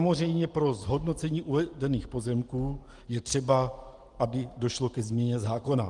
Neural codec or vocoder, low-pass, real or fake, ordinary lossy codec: none; 10.8 kHz; real; Opus, 24 kbps